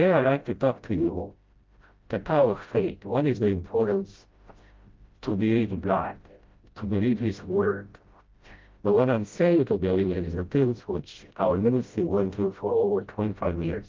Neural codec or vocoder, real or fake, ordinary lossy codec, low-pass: codec, 16 kHz, 0.5 kbps, FreqCodec, smaller model; fake; Opus, 24 kbps; 7.2 kHz